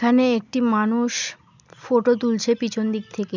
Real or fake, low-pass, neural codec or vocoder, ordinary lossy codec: real; 7.2 kHz; none; none